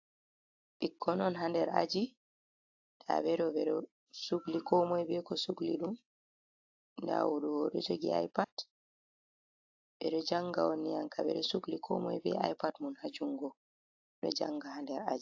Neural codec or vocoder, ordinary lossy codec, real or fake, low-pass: none; AAC, 48 kbps; real; 7.2 kHz